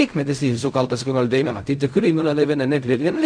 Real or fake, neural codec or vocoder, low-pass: fake; codec, 16 kHz in and 24 kHz out, 0.4 kbps, LongCat-Audio-Codec, fine tuned four codebook decoder; 9.9 kHz